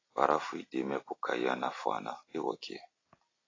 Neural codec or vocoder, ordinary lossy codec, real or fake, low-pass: none; AAC, 32 kbps; real; 7.2 kHz